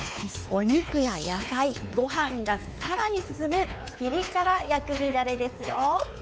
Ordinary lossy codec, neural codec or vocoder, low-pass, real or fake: none; codec, 16 kHz, 4 kbps, X-Codec, WavLM features, trained on Multilingual LibriSpeech; none; fake